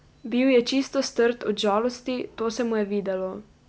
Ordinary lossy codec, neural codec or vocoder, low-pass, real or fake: none; none; none; real